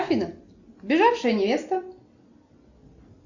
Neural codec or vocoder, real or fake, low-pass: none; real; 7.2 kHz